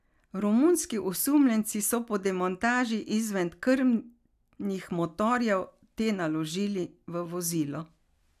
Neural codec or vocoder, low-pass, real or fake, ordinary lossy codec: none; 14.4 kHz; real; none